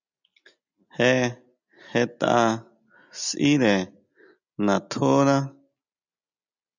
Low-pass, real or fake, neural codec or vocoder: 7.2 kHz; real; none